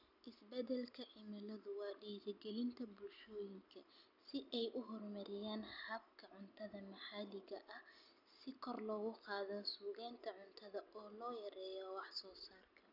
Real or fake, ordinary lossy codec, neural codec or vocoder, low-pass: real; none; none; 5.4 kHz